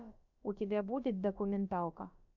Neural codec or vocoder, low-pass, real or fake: codec, 16 kHz, about 1 kbps, DyCAST, with the encoder's durations; 7.2 kHz; fake